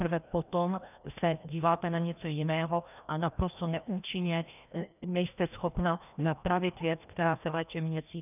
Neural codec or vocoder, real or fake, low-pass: codec, 16 kHz, 1 kbps, FreqCodec, larger model; fake; 3.6 kHz